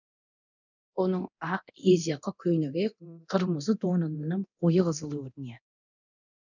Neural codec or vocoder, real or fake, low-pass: codec, 24 kHz, 0.9 kbps, DualCodec; fake; 7.2 kHz